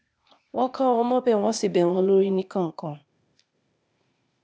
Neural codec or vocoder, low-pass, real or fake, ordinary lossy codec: codec, 16 kHz, 0.8 kbps, ZipCodec; none; fake; none